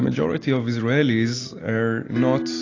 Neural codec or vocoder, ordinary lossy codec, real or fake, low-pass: none; AAC, 32 kbps; real; 7.2 kHz